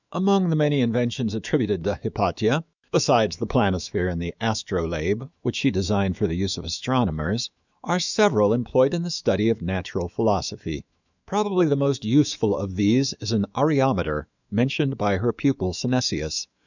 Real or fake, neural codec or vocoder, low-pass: fake; autoencoder, 48 kHz, 128 numbers a frame, DAC-VAE, trained on Japanese speech; 7.2 kHz